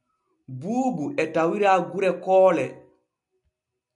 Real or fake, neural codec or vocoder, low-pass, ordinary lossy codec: real; none; 10.8 kHz; MP3, 96 kbps